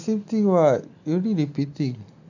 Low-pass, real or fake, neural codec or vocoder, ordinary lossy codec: 7.2 kHz; real; none; none